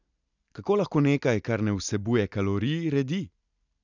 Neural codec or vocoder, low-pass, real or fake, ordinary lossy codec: none; 7.2 kHz; real; none